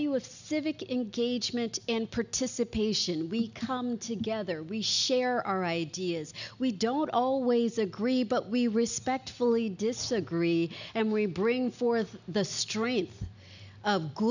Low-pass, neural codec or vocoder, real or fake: 7.2 kHz; none; real